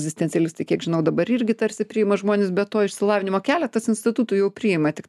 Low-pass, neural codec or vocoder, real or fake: 14.4 kHz; none; real